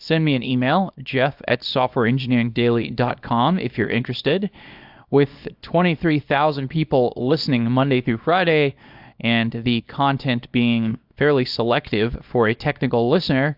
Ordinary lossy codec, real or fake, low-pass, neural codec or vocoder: AAC, 48 kbps; fake; 5.4 kHz; codec, 24 kHz, 0.9 kbps, WavTokenizer, small release